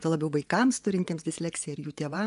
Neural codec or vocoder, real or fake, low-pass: vocoder, 24 kHz, 100 mel bands, Vocos; fake; 10.8 kHz